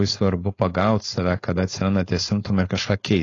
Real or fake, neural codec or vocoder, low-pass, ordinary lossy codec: fake; codec, 16 kHz, 4.8 kbps, FACodec; 7.2 kHz; AAC, 32 kbps